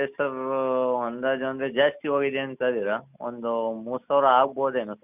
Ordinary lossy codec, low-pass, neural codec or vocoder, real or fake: none; 3.6 kHz; none; real